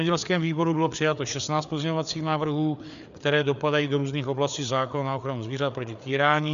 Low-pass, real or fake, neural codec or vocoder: 7.2 kHz; fake; codec, 16 kHz, 4 kbps, FreqCodec, larger model